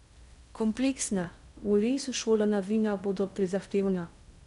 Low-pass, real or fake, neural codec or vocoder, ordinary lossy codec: 10.8 kHz; fake; codec, 16 kHz in and 24 kHz out, 0.6 kbps, FocalCodec, streaming, 2048 codes; none